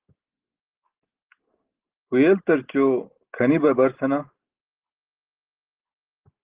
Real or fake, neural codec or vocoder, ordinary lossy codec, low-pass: real; none; Opus, 16 kbps; 3.6 kHz